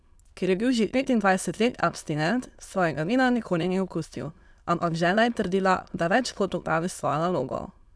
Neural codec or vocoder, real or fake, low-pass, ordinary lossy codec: autoencoder, 22.05 kHz, a latent of 192 numbers a frame, VITS, trained on many speakers; fake; none; none